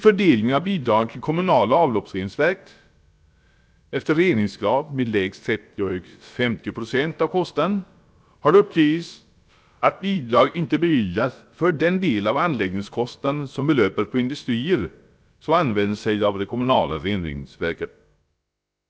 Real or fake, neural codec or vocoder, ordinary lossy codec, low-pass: fake; codec, 16 kHz, about 1 kbps, DyCAST, with the encoder's durations; none; none